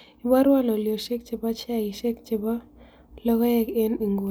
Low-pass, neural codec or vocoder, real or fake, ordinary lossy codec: none; none; real; none